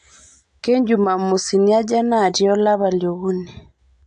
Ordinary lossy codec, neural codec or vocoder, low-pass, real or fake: AAC, 64 kbps; none; 9.9 kHz; real